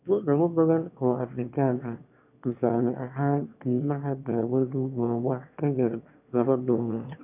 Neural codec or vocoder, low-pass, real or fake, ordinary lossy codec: autoencoder, 22.05 kHz, a latent of 192 numbers a frame, VITS, trained on one speaker; 3.6 kHz; fake; none